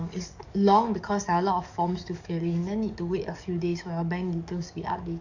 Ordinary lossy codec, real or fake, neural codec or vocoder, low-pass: none; fake; codec, 44.1 kHz, 7.8 kbps, DAC; 7.2 kHz